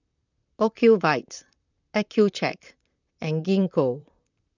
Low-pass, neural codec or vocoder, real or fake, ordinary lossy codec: 7.2 kHz; vocoder, 44.1 kHz, 128 mel bands, Pupu-Vocoder; fake; none